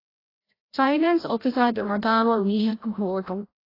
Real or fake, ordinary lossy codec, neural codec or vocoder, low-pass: fake; AAC, 24 kbps; codec, 16 kHz, 0.5 kbps, FreqCodec, larger model; 5.4 kHz